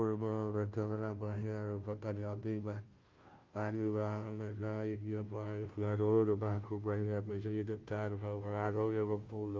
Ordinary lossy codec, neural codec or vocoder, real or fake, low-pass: Opus, 32 kbps; codec, 16 kHz, 0.5 kbps, FunCodec, trained on Chinese and English, 25 frames a second; fake; 7.2 kHz